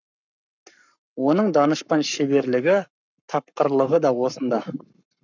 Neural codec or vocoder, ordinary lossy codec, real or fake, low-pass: codec, 44.1 kHz, 7.8 kbps, Pupu-Codec; none; fake; 7.2 kHz